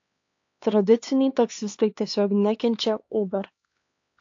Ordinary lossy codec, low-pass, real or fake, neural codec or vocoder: AAC, 48 kbps; 7.2 kHz; fake; codec, 16 kHz, 2 kbps, X-Codec, HuBERT features, trained on LibriSpeech